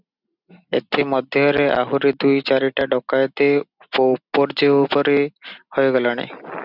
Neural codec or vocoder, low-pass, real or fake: none; 5.4 kHz; real